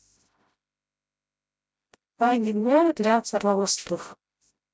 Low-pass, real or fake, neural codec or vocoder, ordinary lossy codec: none; fake; codec, 16 kHz, 0.5 kbps, FreqCodec, smaller model; none